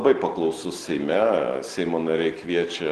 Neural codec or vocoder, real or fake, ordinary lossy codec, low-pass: none; real; Opus, 16 kbps; 10.8 kHz